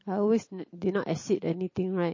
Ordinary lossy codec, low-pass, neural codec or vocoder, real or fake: MP3, 32 kbps; 7.2 kHz; none; real